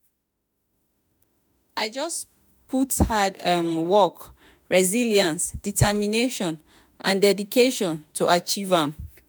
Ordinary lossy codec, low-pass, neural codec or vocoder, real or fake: none; none; autoencoder, 48 kHz, 32 numbers a frame, DAC-VAE, trained on Japanese speech; fake